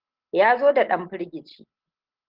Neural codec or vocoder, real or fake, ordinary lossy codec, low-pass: none; real; Opus, 16 kbps; 5.4 kHz